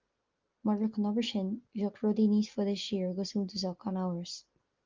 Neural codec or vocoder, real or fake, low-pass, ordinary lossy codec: none; real; 7.2 kHz; Opus, 24 kbps